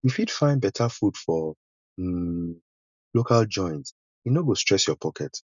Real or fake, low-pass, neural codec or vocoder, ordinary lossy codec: real; 7.2 kHz; none; none